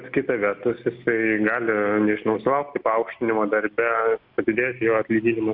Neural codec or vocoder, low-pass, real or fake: none; 5.4 kHz; real